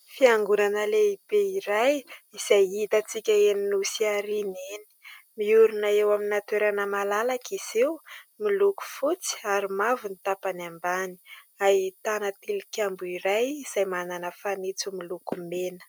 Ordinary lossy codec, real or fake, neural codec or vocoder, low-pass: MP3, 96 kbps; real; none; 19.8 kHz